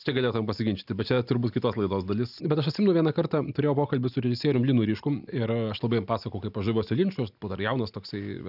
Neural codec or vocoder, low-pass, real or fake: none; 5.4 kHz; real